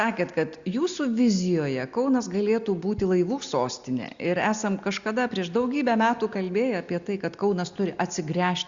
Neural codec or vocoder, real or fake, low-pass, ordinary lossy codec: none; real; 7.2 kHz; Opus, 64 kbps